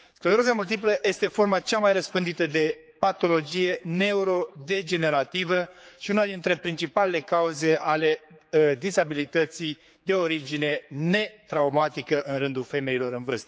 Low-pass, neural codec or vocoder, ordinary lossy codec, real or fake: none; codec, 16 kHz, 4 kbps, X-Codec, HuBERT features, trained on general audio; none; fake